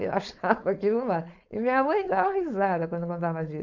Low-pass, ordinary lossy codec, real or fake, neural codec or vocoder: 7.2 kHz; none; fake; codec, 16 kHz, 4.8 kbps, FACodec